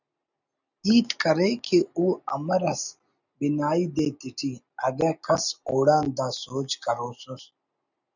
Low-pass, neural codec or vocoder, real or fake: 7.2 kHz; none; real